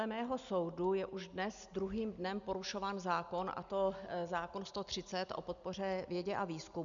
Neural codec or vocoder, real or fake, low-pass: none; real; 7.2 kHz